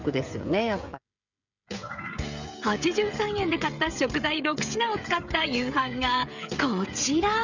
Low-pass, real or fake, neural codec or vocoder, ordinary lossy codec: 7.2 kHz; fake; codec, 16 kHz, 16 kbps, FreqCodec, smaller model; none